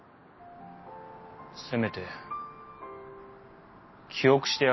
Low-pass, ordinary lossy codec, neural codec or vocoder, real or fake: 7.2 kHz; MP3, 24 kbps; none; real